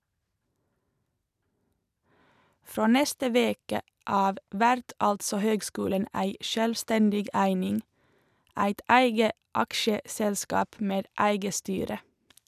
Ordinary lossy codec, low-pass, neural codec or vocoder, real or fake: none; 14.4 kHz; none; real